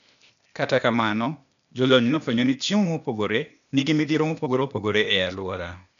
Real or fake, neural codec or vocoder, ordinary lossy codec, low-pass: fake; codec, 16 kHz, 0.8 kbps, ZipCodec; none; 7.2 kHz